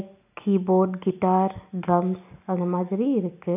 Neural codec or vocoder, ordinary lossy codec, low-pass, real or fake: none; none; 3.6 kHz; real